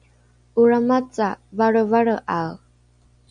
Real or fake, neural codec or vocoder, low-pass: real; none; 9.9 kHz